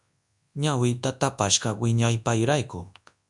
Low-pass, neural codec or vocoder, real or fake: 10.8 kHz; codec, 24 kHz, 0.9 kbps, WavTokenizer, large speech release; fake